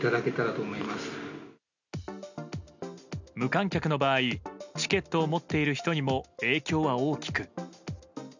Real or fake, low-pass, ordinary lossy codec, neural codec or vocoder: real; 7.2 kHz; none; none